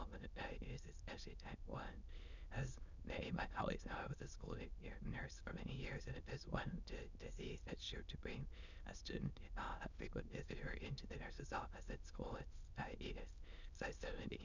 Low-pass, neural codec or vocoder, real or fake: 7.2 kHz; autoencoder, 22.05 kHz, a latent of 192 numbers a frame, VITS, trained on many speakers; fake